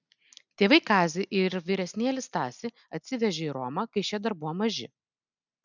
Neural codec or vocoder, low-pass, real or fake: none; 7.2 kHz; real